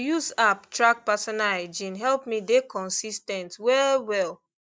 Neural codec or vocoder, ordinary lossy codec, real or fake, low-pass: none; none; real; none